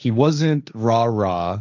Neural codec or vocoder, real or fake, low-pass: codec, 16 kHz, 1.1 kbps, Voila-Tokenizer; fake; 7.2 kHz